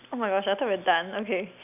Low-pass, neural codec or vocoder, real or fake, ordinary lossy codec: 3.6 kHz; none; real; none